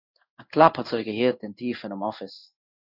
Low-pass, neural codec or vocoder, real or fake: 5.4 kHz; codec, 16 kHz in and 24 kHz out, 1 kbps, XY-Tokenizer; fake